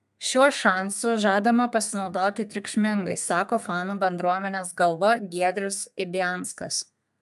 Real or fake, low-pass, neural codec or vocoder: fake; 14.4 kHz; codec, 32 kHz, 1.9 kbps, SNAC